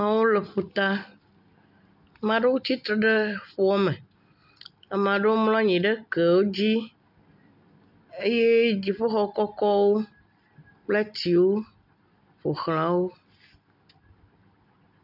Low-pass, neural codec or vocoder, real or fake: 5.4 kHz; none; real